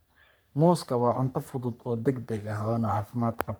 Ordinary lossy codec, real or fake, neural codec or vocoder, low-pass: none; fake; codec, 44.1 kHz, 3.4 kbps, Pupu-Codec; none